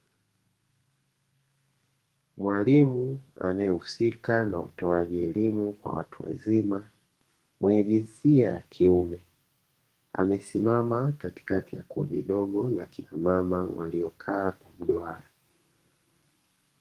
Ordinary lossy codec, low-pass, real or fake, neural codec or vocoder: Opus, 24 kbps; 14.4 kHz; fake; codec, 32 kHz, 1.9 kbps, SNAC